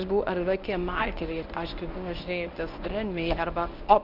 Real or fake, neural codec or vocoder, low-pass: fake; codec, 24 kHz, 0.9 kbps, WavTokenizer, medium speech release version 1; 5.4 kHz